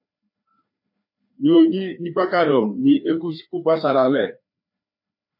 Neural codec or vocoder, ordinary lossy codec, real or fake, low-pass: codec, 16 kHz, 2 kbps, FreqCodec, larger model; MP3, 32 kbps; fake; 5.4 kHz